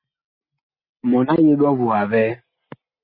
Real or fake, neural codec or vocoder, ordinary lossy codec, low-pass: real; none; MP3, 24 kbps; 5.4 kHz